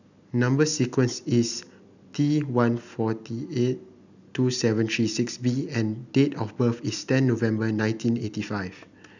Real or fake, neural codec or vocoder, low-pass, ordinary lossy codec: real; none; 7.2 kHz; none